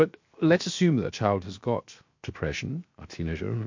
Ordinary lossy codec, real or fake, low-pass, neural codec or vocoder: MP3, 48 kbps; fake; 7.2 kHz; codec, 16 kHz, 0.7 kbps, FocalCodec